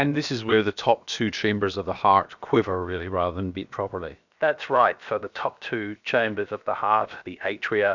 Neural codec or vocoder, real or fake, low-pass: codec, 16 kHz, about 1 kbps, DyCAST, with the encoder's durations; fake; 7.2 kHz